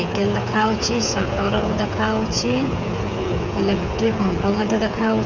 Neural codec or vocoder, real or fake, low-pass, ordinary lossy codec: codec, 16 kHz, 8 kbps, FreqCodec, smaller model; fake; 7.2 kHz; none